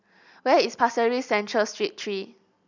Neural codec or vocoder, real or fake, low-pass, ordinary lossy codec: none; real; 7.2 kHz; none